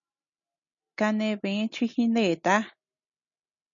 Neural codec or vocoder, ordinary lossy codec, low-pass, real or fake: none; MP3, 96 kbps; 7.2 kHz; real